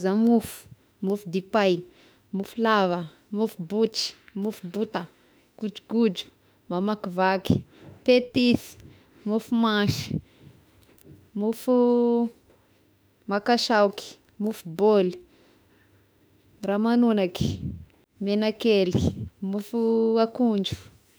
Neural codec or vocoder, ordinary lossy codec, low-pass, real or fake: autoencoder, 48 kHz, 32 numbers a frame, DAC-VAE, trained on Japanese speech; none; none; fake